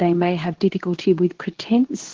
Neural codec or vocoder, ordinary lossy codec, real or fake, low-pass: codec, 24 kHz, 0.9 kbps, WavTokenizer, medium speech release version 2; Opus, 16 kbps; fake; 7.2 kHz